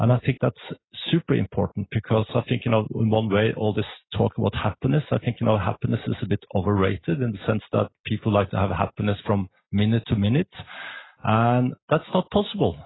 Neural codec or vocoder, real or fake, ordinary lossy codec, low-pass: none; real; AAC, 16 kbps; 7.2 kHz